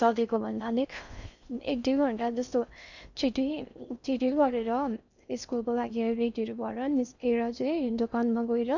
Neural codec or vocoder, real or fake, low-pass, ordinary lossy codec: codec, 16 kHz in and 24 kHz out, 0.6 kbps, FocalCodec, streaming, 4096 codes; fake; 7.2 kHz; none